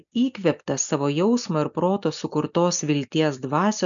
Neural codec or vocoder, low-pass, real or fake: none; 7.2 kHz; real